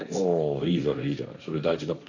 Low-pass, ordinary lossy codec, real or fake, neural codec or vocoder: 7.2 kHz; none; fake; codec, 16 kHz, 1.1 kbps, Voila-Tokenizer